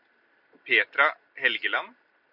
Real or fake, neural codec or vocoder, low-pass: real; none; 5.4 kHz